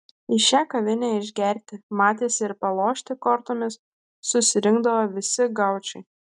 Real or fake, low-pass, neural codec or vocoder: real; 10.8 kHz; none